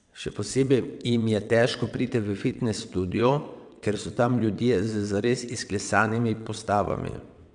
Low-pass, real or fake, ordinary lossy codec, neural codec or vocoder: 9.9 kHz; fake; none; vocoder, 22.05 kHz, 80 mel bands, WaveNeXt